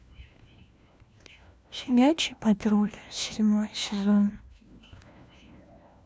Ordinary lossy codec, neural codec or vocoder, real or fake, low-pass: none; codec, 16 kHz, 1 kbps, FunCodec, trained on LibriTTS, 50 frames a second; fake; none